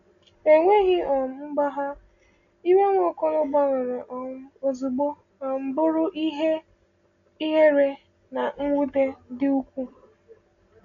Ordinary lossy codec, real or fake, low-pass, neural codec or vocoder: AAC, 48 kbps; real; 7.2 kHz; none